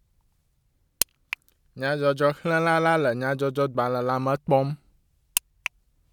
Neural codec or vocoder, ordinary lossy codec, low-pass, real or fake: none; none; 19.8 kHz; real